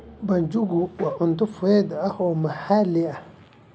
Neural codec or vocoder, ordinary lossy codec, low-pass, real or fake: none; none; none; real